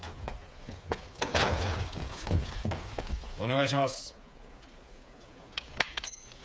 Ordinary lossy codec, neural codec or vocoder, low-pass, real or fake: none; codec, 16 kHz, 4 kbps, FreqCodec, smaller model; none; fake